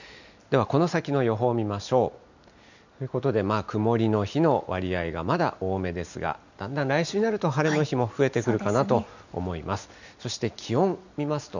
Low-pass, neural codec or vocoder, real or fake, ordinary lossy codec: 7.2 kHz; none; real; none